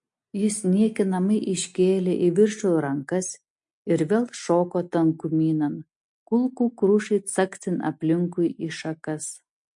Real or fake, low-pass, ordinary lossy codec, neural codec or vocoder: real; 10.8 kHz; MP3, 48 kbps; none